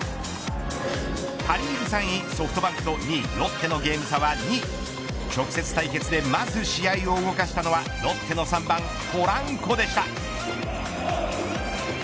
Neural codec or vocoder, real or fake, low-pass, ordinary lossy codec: none; real; none; none